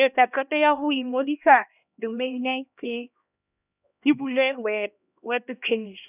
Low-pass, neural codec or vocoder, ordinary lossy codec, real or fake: 3.6 kHz; codec, 16 kHz, 1 kbps, X-Codec, HuBERT features, trained on LibriSpeech; none; fake